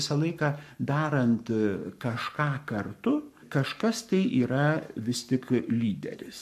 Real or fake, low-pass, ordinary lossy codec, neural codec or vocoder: fake; 14.4 kHz; AAC, 96 kbps; codec, 44.1 kHz, 7.8 kbps, Pupu-Codec